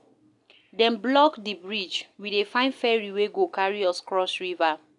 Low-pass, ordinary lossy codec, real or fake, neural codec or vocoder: 10.8 kHz; none; real; none